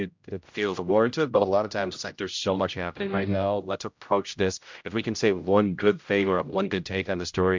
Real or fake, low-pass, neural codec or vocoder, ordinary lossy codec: fake; 7.2 kHz; codec, 16 kHz, 0.5 kbps, X-Codec, HuBERT features, trained on general audio; MP3, 64 kbps